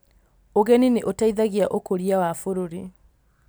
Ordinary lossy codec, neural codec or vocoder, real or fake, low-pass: none; none; real; none